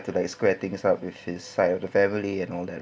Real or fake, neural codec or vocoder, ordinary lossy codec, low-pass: real; none; none; none